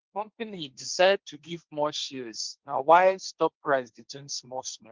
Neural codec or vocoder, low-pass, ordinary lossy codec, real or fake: codec, 16 kHz, 1.1 kbps, Voila-Tokenizer; 7.2 kHz; Opus, 32 kbps; fake